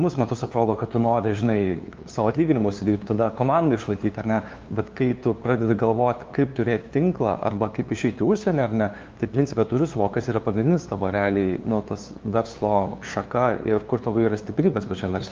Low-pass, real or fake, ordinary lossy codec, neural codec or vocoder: 7.2 kHz; fake; Opus, 32 kbps; codec, 16 kHz, 2 kbps, FunCodec, trained on LibriTTS, 25 frames a second